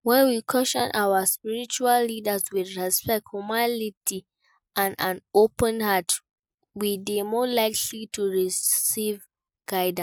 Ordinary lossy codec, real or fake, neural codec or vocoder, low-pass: none; real; none; none